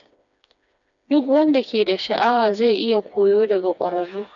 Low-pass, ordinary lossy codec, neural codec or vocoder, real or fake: 7.2 kHz; none; codec, 16 kHz, 2 kbps, FreqCodec, smaller model; fake